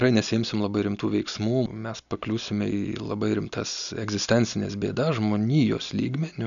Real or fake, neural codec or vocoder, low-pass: real; none; 7.2 kHz